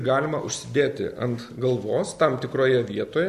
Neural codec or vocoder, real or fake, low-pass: none; real; 14.4 kHz